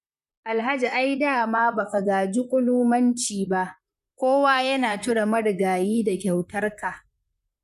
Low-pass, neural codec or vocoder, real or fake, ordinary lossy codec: 14.4 kHz; vocoder, 44.1 kHz, 128 mel bands, Pupu-Vocoder; fake; none